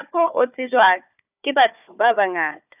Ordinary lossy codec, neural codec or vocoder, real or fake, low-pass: none; codec, 16 kHz, 4 kbps, FunCodec, trained on Chinese and English, 50 frames a second; fake; 3.6 kHz